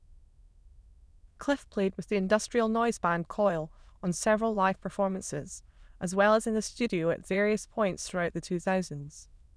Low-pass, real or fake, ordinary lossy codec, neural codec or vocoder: none; fake; none; autoencoder, 22.05 kHz, a latent of 192 numbers a frame, VITS, trained on many speakers